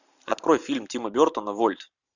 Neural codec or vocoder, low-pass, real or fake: vocoder, 44.1 kHz, 128 mel bands every 512 samples, BigVGAN v2; 7.2 kHz; fake